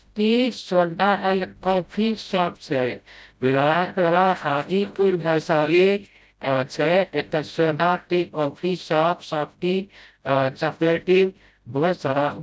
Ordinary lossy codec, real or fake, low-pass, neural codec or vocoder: none; fake; none; codec, 16 kHz, 0.5 kbps, FreqCodec, smaller model